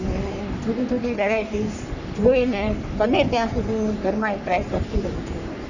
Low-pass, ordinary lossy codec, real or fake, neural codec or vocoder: 7.2 kHz; none; fake; codec, 44.1 kHz, 3.4 kbps, Pupu-Codec